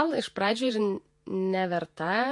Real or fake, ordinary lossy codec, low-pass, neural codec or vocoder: fake; MP3, 48 kbps; 10.8 kHz; vocoder, 48 kHz, 128 mel bands, Vocos